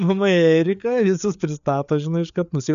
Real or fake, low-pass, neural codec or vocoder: fake; 7.2 kHz; codec, 16 kHz, 4 kbps, FreqCodec, larger model